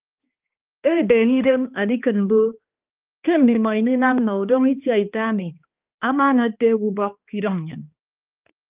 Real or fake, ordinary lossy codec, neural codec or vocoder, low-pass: fake; Opus, 24 kbps; codec, 16 kHz, 2 kbps, X-Codec, HuBERT features, trained on balanced general audio; 3.6 kHz